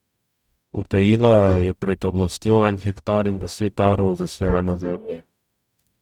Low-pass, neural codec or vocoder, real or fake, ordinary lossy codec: 19.8 kHz; codec, 44.1 kHz, 0.9 kbps, DAC; fake; none